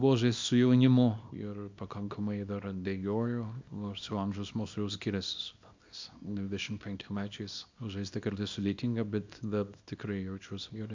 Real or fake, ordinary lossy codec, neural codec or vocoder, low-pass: fake; MP3, 64 kbps; codec, 24 kHz, 0.9 kbps, WavTokenizer, small release; 7.2 kHz